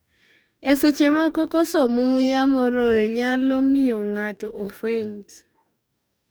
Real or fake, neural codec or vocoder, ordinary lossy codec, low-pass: fake; codec, 44.1 kHz, 2.6 kbps, DAC; none; none